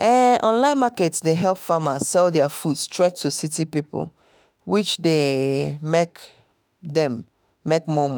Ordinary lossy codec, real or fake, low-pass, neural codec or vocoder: none; fake; none; autoencoder, 48 kHz, 32 numbers a frame, DAC-VAE, trained on Japanese speech